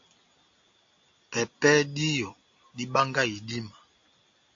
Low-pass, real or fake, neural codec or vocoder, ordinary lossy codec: 7.2 kHz; real; none; AAC, 48 kbps